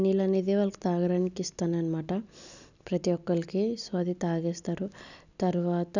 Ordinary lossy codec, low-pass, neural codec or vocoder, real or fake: none; 7.2 kHz; none; real